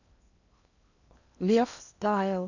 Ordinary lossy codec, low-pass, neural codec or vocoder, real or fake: none; 7.2 kHz; codec, 16 kHz in and 24 kHz out, 0.8 kbps, FocalCodec, streaming, 65536 codes; fake